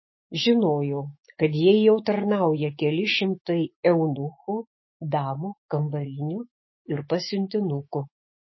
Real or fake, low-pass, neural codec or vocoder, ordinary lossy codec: fake; 7.2 kHz; autoencoder, 48 kHz, 128 numbers a frame, DAC-VAE, trained on Japanese speech; MP3, 24 kbps